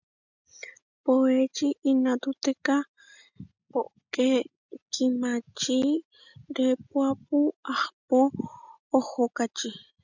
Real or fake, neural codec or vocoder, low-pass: real; none; 7.2 kHz